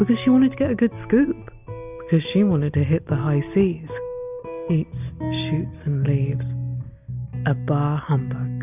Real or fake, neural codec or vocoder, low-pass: real; none; 3.6 kHz